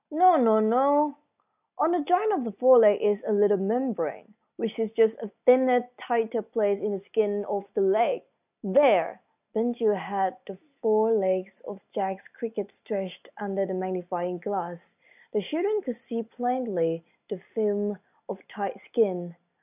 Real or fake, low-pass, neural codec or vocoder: real; 3.6 kHz; none